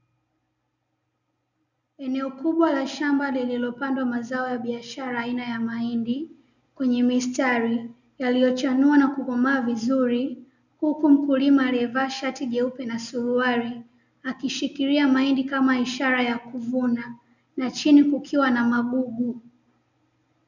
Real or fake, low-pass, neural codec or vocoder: real; 7.2 kHz; none